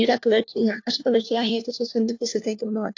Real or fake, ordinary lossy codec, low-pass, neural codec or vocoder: fake; AAC, 48 kbps; 7.2 kHz; codec, 16 kHz, 1 kbps, FunCodec, trained on LibriTTS, 50 frames a second